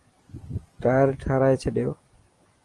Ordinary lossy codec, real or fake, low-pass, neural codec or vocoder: Opus, 24 kbps; real; 10.8 kHz; none